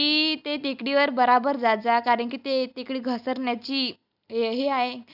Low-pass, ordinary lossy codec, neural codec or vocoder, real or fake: 5.4 kHz; none; none; real